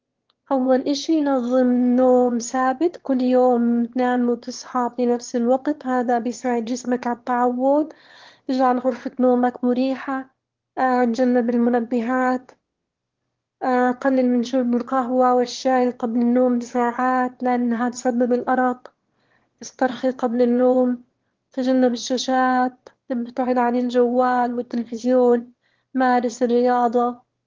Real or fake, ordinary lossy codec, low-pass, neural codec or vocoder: fake; Opus, 16 kbps; 7.2 kHz; autoencoder, 22.05 kHz, a latent of 192 numbers a frame, VITS, trained on one speaker